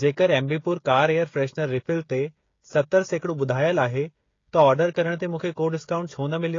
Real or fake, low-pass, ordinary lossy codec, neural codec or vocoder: fake; 7.2 kHz; AAC, 32 kbps; codec, 16 kHz, 16 kbps, FreqCodec, smaller model